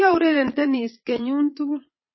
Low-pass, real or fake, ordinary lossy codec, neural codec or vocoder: 7.2 kHz; fake; MP3, 24 kbps; vocoder, 22.05 kHz, 80 mel bands, Vocos